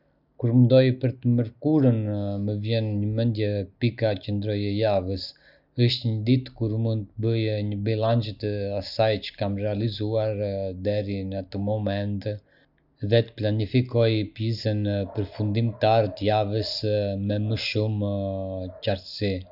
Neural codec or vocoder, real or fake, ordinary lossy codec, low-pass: none; real; none; 5.4 kHz